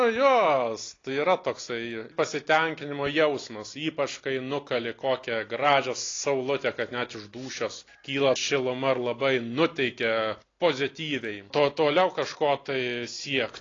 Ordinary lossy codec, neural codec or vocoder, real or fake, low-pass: AAC, 32 kbps; none; real; 7.2 kHz